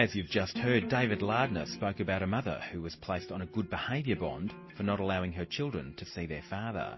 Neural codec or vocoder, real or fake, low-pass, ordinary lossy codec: none; real; 7.2 kHz; MP3, 24 kbps